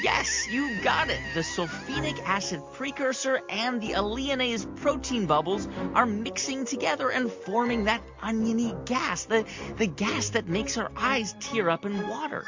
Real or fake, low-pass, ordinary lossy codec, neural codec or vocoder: real; 7.2 kHz; MP3, 48 kbps; none